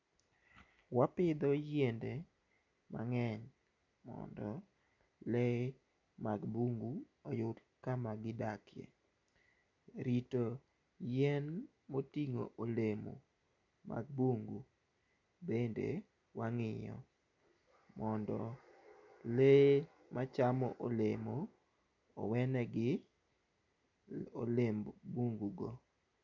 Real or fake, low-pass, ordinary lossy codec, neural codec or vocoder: real; 7.2 kHz; none; none